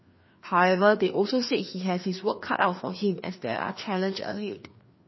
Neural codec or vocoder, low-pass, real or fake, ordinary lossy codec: codec, 16 kHz, 2 kbps, FreqCodec, larger model; 7.2 kHz; fake; MP3, 24 kbps